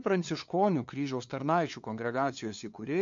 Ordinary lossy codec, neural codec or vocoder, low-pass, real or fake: MP3, 48 kbps; codec, 16 kHz, 2 kbps, FunCodec, trained on LibriTTS, 25 frames a second; 7.2 kHz; fake